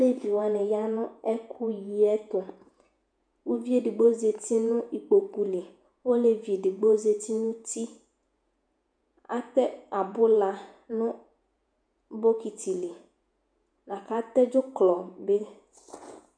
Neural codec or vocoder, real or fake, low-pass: vocoder, 48 kHz, 128 mel bands, Vocos; fake; 9.9 kHz